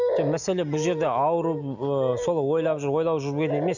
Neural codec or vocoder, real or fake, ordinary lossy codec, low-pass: none; real; none; 7.2 kHz